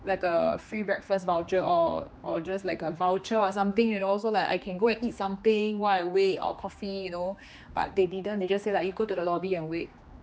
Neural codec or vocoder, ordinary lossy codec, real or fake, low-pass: codec, 16 kHz, 2 kbps, X-Codec, HuBERT features, trained on general audio; none; fake; none